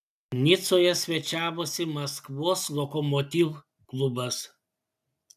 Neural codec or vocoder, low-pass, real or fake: none; 14.4 kHz; real